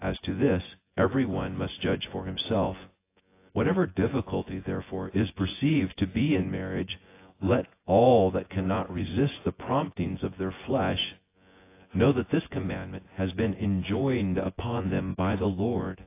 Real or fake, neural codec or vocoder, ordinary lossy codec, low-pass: fake; vocoder, 24 kHz, 100 mel bands, Vocos; AAC, 24 kbps; 3.6 kHz